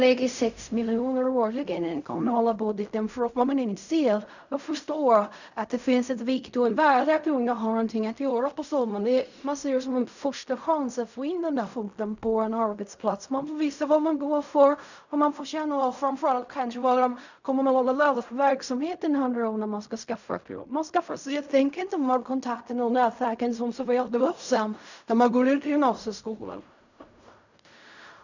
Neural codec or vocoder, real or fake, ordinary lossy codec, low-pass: codec, 16 kHz in and 24 kHz out, 0.4 kbps, LongCat-Audio-Codec, fine tuned four codebook decoder; fake; none; 7.2 kHz